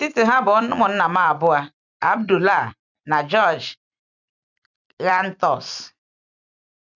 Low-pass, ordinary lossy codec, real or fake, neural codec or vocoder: 7.2 kHz; none; fake; vocoder, 44.1 kHz, 128 mel bands every 256 samples, BigVGAN v2